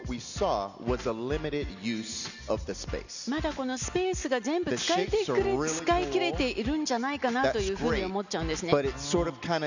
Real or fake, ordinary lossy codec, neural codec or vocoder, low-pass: real; none; none; 7.2 kHz